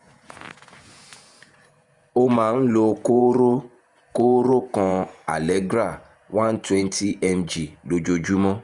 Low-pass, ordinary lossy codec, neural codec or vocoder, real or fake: 10.8 kHz; Opus, 64 kbps; vocoder, 48 kHz, 128 mel bands, Vocos; fake